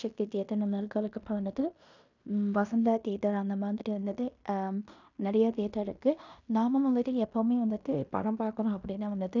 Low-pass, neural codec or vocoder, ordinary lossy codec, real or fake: 7.2 kHz; codec, 16 kHz in and 24 kHz out, 0.9 kbps, LongCat-Audio-Codec, fine tuned four codebook decoder; none; fake